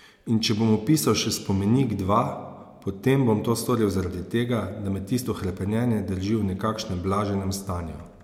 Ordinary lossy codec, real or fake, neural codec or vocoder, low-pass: MP3, 96 kbps; real; none; 19.8 kHz